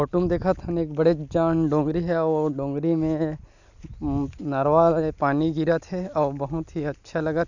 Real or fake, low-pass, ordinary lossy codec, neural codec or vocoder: real; 7.2 kHz; none; none